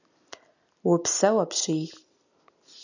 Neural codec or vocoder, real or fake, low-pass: none; real; 7.2 kHz